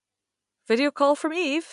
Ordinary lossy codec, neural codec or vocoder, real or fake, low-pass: none; none; real; 10.8 kHz